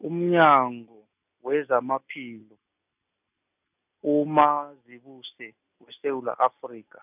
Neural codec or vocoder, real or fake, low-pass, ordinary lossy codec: none; real; 3.6 kHz; none